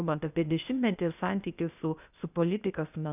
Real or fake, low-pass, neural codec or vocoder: fake; 3.6 kHz; codec, 16 kHz, 0.8 kbps, ZipCodec